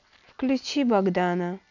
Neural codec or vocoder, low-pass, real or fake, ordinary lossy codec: none; 7.2 kHz; real; none